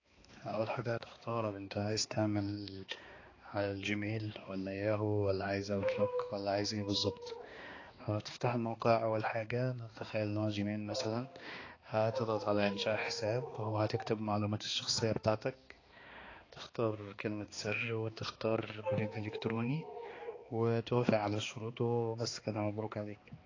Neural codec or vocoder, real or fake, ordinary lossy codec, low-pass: codec, 16 kHz, 2 kbps, X-Codec, HuBERT features, trained on balanced general audio; fake; AAC, 32 kbps; 7.2 kHz